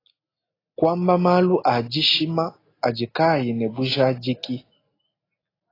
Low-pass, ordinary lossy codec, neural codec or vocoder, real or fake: 5.4 kHz; AAC, 24 kbps; none; real